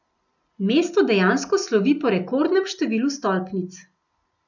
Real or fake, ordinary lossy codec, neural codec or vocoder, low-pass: real; none; none; 7.2 kHz